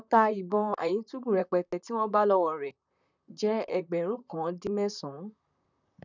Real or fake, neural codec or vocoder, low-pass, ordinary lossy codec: fake; vocoder, 44.1 kHz, 128 mel bands, Pupu-Vocoder; 7.2 kHz; none